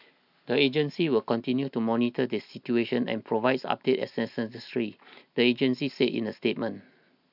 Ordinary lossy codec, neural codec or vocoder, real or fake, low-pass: none; none; real; 5.4 kHz